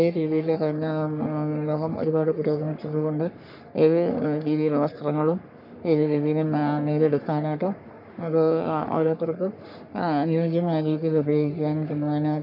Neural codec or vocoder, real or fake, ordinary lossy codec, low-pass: codec, 44.1 kHz, 3.4 kbps, Pupu-Codec; fake; none; 5.4 kHz